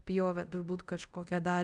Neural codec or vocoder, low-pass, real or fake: codec, 24 kHz, 0.5 kbps, DualCodec; 10.8 kHz; fake